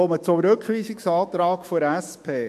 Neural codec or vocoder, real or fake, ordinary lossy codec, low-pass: autoencoder, 48 kHz, 128 numbers a frame, DAC-VAE, trained on Japanese speech; fake; MP3, 64 kbps; 14.4 kHz